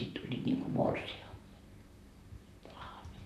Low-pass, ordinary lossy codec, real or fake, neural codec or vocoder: 14.4 kHz; none; fake; vocoder, 44.1 kHz, 128 mel bands, Pupu-Vocoder